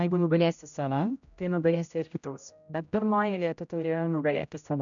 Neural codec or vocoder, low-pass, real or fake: codec, 16 kHz, 0.5 kbps, X-Codec, HuBERT features, trained on general audio; 7.2 kHz; fake